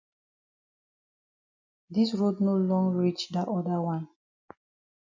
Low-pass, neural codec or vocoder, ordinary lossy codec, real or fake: 7.2 kHz; none; MP3, 48 kbps; real